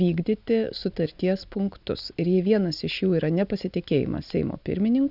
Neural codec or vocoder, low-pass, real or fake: none; 5.4 kHz; real